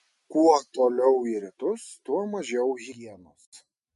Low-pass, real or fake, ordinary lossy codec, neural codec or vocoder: 14.4 kHz; real; MP3, 48 kbps; none